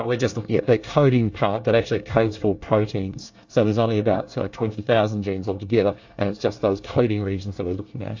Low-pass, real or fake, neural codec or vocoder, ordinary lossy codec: 7.2 kHz; fake; codec, 24 kHz, 1 kbps, SNAC; AAC, 48 kbps